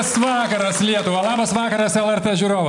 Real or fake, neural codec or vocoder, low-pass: real; none; 10.8 kHz